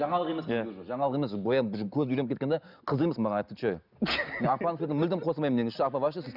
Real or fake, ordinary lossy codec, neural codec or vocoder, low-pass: real; none; none; 5.4 kHz